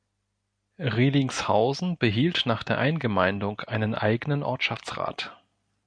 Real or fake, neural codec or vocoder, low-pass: real; none; 9.9 kHz